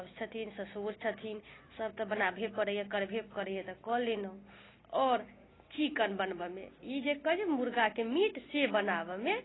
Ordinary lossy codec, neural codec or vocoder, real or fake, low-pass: AAC, 16 kbps; none; real; 7.2 kHz